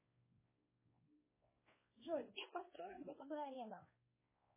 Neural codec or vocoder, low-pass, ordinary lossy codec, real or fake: codec, 16 kHz, 2 kbps, X-Codec, WavLM features, trained on Multilingual LibriSpeech; 3.6 kHz; MP3, 16 kbps; fake